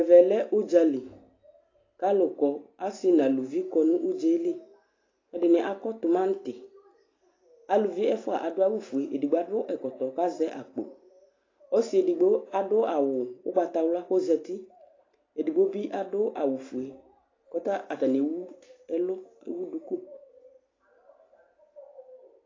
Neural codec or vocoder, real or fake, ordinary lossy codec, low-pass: none; real; AAC, 32 kbps; 7.2 kHz